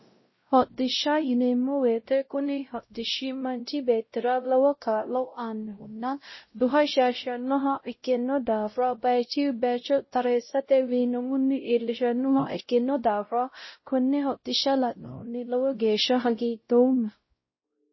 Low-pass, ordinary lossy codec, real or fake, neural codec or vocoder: 7.2 kHz; MP3, 24 kbps; fake; codec, 16 kHz, 0.5 kbps, X-Codec, WavLM features, trained on Multilingual LibriSpeech